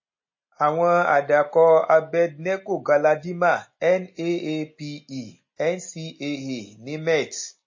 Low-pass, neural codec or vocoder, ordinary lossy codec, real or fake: 7.2 kHz; none; MP3, 32 kbps; real